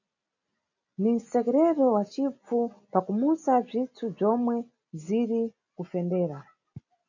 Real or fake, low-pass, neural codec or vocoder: real; 7.2 kHz; none